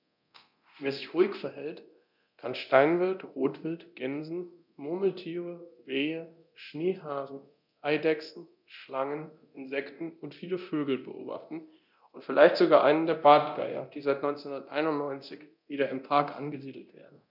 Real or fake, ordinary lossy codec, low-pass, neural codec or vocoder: fake; none; 5.4 kHz; codec, 24 kHz, 0.9 kbps, DualCodec